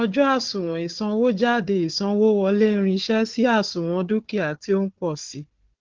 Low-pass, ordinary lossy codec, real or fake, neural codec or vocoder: 7.2 kHz; Opus, 16 kbps; fake; codec, 16 kHz, 4 kbps, FunCodec, trained on Chinese and English, 50 frames a second